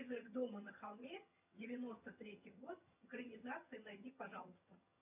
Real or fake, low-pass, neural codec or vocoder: fake; 3.6 kHz; vocoder, 22.05 kHz, 80 mel bands, HiFi-GAN